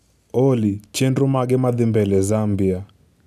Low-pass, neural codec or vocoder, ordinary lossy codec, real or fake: 14.4 kHz; none; none; real